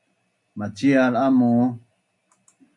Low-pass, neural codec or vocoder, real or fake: 10.8 kHz; none; real